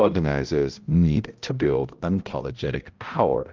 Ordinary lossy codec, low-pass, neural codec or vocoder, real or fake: Opus, 24 kbps; 7.2 kHz; codec, 16 kHz, 0.5 kbps, X-Codec, HuBERT features, trained on balanced general audio; fake